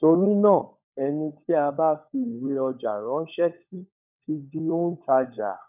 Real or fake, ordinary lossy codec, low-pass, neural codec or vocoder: fake; none; 3.6 kHz; codec, 16 kHz, 16 kbps, FunCodec, trained on LibriTTS, 50 frames a second